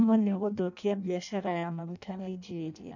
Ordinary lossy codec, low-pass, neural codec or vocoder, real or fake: none; 7.2 kHz; codec, 16 kHz in and 24 kHz out, 0.6 kbps, FireRedTTS-2 codec; fake